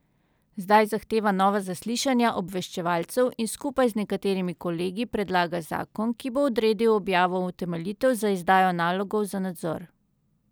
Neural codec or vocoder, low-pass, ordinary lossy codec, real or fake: none; none; none; real